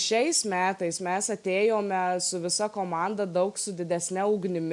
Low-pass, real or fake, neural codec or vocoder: 10.8 kHz; real; none